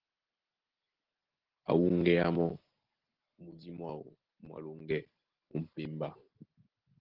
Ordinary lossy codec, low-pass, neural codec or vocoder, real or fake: Opus, 16 kbps; 5.4 kHz; none; real